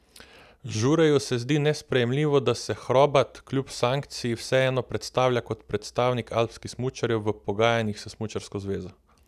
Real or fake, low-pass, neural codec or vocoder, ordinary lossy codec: real; 14.4 kHz; none; none